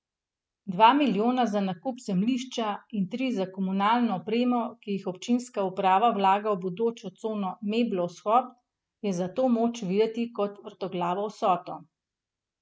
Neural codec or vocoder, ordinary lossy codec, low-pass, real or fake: none; none; none; real